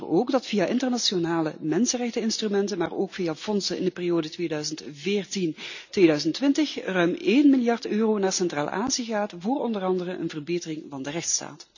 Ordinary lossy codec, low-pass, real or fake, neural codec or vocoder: none; 7.2 kHz; real; none